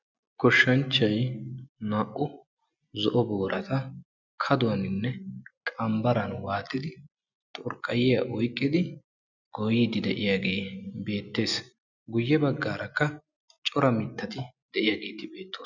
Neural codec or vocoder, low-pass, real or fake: none; 7.2 kHz; real